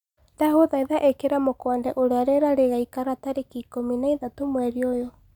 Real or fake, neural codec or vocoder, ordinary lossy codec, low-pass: real; none; none; 19.8 kHz